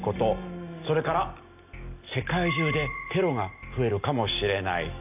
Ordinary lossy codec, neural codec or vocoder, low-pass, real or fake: AAC, 32 kbps; none; 3.6 kHz; real